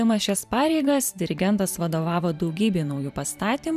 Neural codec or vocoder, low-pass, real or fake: vocoder, 44.1 kHz, 128 mel bands every 512 samples, BigVGAN v2; 14.4 kHz; fake